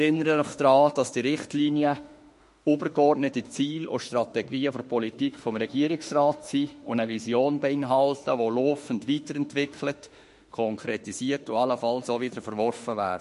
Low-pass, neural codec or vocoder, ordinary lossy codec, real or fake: 14.4 kHz; autoencoder, 48 kHz, 32 numbers a frame, DAC-VAE, trained on Japanese speech; MP3, 48 kbps; fake